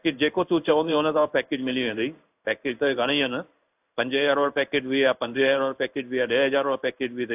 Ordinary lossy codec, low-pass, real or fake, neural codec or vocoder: AAC, 32 kbps; 3.6 kHz; fake; codec, 16 kHz in and 24 kHz out, 1 kbps, XY-Tokenizer